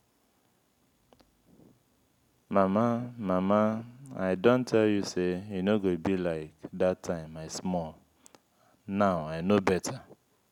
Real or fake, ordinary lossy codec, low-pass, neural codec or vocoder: real; none; 19.8 kHz; none